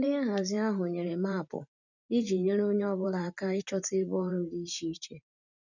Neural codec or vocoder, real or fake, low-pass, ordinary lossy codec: vocoder, 44.1 kHz, 128 mel bands every 512 samples, BigVGAN v2; fake; 7.2 kHz; none